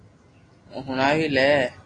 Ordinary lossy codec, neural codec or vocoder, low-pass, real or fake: AAC, 32 kbps; none; 9.9 kHz; real